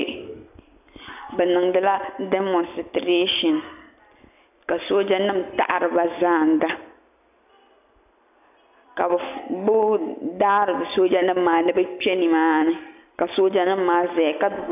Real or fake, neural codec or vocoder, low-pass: real; none; 3.6 kHz